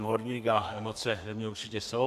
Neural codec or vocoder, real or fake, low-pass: codec, 32 kHz, 1.9 kbps, SNAC; fake; 14.4 kHz